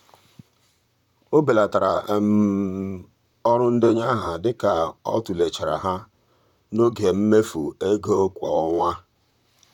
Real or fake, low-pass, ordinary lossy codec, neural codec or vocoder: fake; 19.8 kHz; none; vocoder, 44.1 kHz, 128 mel bands, Pupu-Vocoder